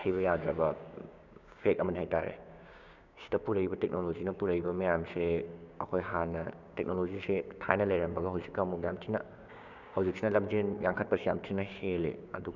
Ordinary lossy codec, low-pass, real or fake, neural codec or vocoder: none; 7.2 kHz; fake; codec, 16 kHz, 6 kbps, DAC